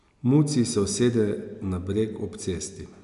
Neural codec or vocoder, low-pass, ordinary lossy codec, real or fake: none; 10.8 kHz; none; real